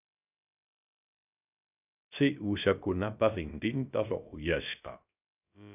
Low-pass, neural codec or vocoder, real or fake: 3.6 kHz; codec, 16 kHz, 0.3 kbps, FocalCodec; fake